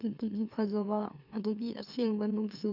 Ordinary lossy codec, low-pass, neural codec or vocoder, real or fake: none; 5.4 kHz; autoencoder, 44.1 kHz, a latent of 192 numbers a frame, MeloTTS; fake